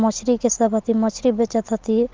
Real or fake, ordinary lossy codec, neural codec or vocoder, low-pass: real; Opus, 16 kbps; none; 7.2 kHz